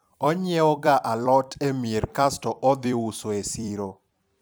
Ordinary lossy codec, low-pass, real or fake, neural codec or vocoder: none; none; fake; vocoder, 44.1 kHz, 128 mel bands every 256 samples, BigVGAN v2